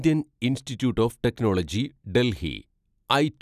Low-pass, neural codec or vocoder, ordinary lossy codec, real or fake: 14.4 kHz; none; none; real